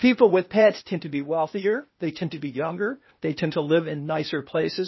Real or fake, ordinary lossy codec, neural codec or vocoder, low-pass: fake; MP3, 24 kbps; codec, 16 kHz, 0.8 kbps, ZipCodec; 7.2 kHz